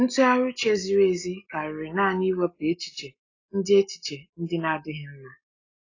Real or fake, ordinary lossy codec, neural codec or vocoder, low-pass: real; AAC, 32 kbps; none; 7.2 kHz